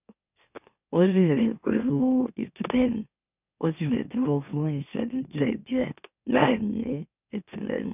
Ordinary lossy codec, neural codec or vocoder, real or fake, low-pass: none; autoencoder, 44.1 kHz, a latent of 192 numbers a frame, MeloTTS; fake; 3.6 kHz